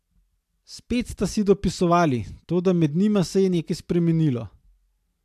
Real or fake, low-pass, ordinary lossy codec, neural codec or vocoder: real; 14.4 kHz; AAC, 96 kbps; none